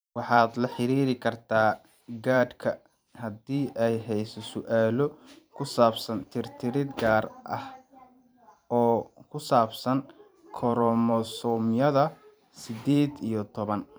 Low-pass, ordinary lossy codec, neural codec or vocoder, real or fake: none; none; vocoder, 44.1 kHz, 128 mel bands every 512 samples, BigVGAN v2; fake